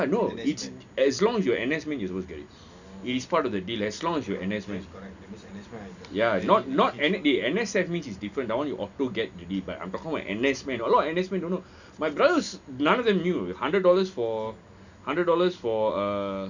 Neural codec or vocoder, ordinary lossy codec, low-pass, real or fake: none; none; 7.2 kHz; real